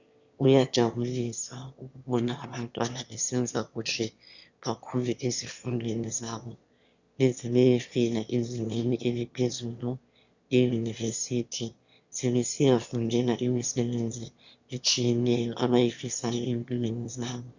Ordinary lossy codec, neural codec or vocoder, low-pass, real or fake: Opus, 64 kbps; autoencoder, 22.05 kHz, a latent of 192 numbers a frame, VITS, trained on one speaker; 7.2 kHz; fake